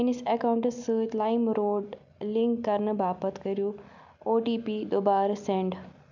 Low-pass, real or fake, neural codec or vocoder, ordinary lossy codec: 7.2 kHz; real; none; none